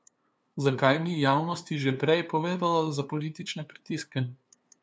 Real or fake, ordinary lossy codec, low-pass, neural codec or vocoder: fake; none; none; codec, 16 kHz, 2 kbps, FunCodec, trained on LibriTTS, 25 frames a second